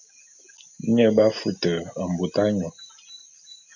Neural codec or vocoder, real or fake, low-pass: none; real; 7.2 kHz